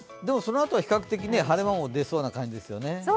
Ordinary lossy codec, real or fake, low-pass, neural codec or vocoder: none; real; none; none